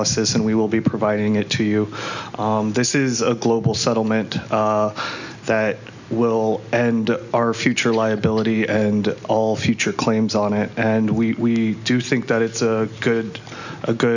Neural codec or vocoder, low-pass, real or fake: none; 7.2 kHz; real